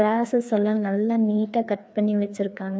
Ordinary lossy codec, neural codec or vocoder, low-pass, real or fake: none; codec, 16 kHz, 2 kbps, FreqCodec, larger model; none; fake